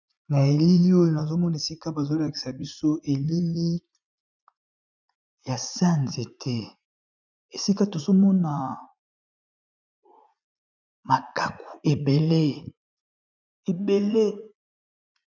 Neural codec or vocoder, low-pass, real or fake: vocoder, 44.1 kHz, 80 mel bands, Vocos; 7.2 kHz; fake